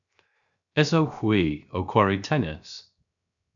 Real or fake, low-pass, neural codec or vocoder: fake; 7.2 kHz; codec, 16 kHz, 0.7 kbps, FocalCodec